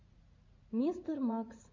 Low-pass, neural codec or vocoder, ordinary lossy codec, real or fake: 7.2 kHz; vocoder, 44.1 kHz, 80 mel bands, Vocos; MP3, 64 kbps; fake